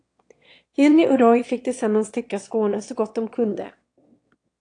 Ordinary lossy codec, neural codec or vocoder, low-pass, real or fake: AAC, 48 kbps; autoencoder, 22.05 kHz, a latent of 192 numbers a frame, VITS, trained on one speaker; 9.9 kHz; fake